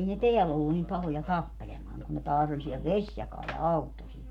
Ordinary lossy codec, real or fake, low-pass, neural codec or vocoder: none; fake; 19.8 kHz; codec, 44.1 kHz, 7.8 kbps, Pupu-Codec